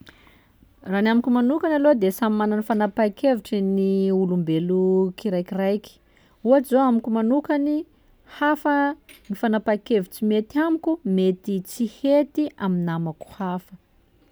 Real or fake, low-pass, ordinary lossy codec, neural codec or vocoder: real; none; none; none